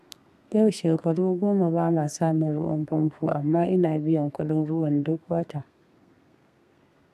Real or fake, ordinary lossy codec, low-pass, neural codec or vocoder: fake; none; 14.4 kHz; codec, 32 kHz, 1.9 kbps, SNAC